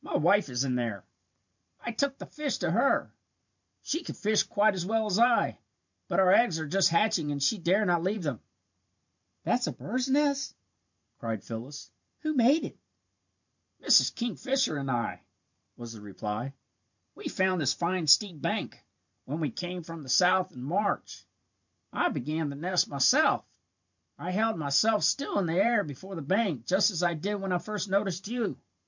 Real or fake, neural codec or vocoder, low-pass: fake; vocoder, 44.1 kHz, 128 mel bands every 512 samples, BigVGAN v2; 7.2 kHz